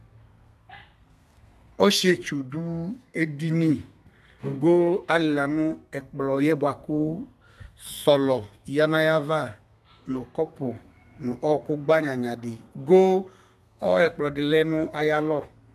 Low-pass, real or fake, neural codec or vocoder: 14.4 kHz; fake; codec, 32 kHz, 1.9 kbps, SNAC